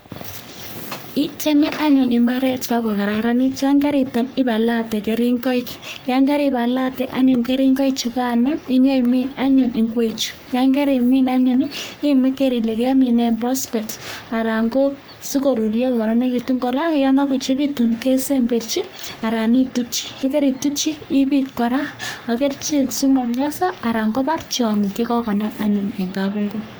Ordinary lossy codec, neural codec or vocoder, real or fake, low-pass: none; codec, 44.1 kHz, 3.4 kbps, Pupu-Codec; fake; none